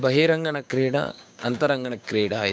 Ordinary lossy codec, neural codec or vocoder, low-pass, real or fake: none; codec, 16 kHz, 6 kbps, DAC; none; fake